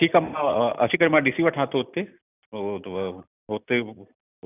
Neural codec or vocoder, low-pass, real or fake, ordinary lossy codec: none; 3.6 kHz; real; none